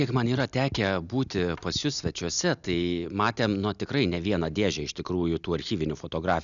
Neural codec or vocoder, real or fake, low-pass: none; real; 7.2 kHz